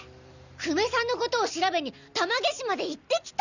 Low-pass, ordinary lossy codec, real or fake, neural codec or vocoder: 7.2 kHz; none; real; none